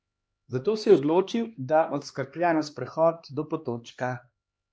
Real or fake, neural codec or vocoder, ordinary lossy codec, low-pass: fake; codec, 16 kHz, 2 kbps, X-Codec, HuBERT features, trained on LibriSpeech; none; none